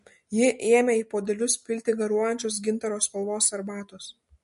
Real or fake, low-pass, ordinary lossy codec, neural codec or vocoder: real; 14.4 kHz; MP3, 48 kbps; none